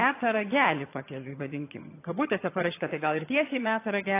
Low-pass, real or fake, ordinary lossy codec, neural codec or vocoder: 3.6 kHz; fake; AAC, 24 kbps; vocoder, 22.05 kHz, 80 mel bands, HiFi-GAN